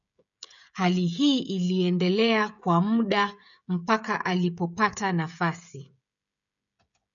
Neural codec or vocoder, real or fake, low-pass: codec, 16 kHz, 16 kbps, FreqCodec, smaller model; fake; 7.2 kHz